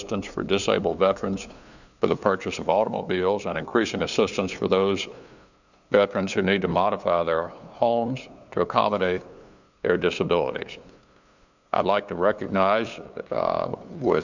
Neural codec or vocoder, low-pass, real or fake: codec, 16 kHz, 2 kbps, FunCodec, trained on LibriTTS, 25 frames a second; 7.2 kHz; fake